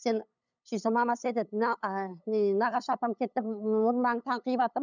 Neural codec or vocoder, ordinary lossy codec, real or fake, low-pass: codec, 16 kHz, 8 kbps, FunCodec, trained on LibriTTS, 25 frames a second; none; fake; 7.2 kHz